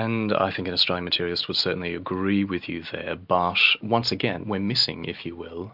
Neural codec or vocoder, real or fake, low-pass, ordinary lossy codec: none; real; 5.4 kHz; AAC, 48 kbps